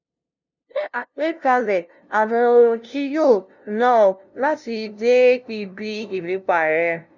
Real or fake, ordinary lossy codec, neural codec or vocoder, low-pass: fake; none; codec, 16 kHz, 0.5 kbps, FunCodec, trained on LibriTTS, 25 frames a second; 7.2 kHz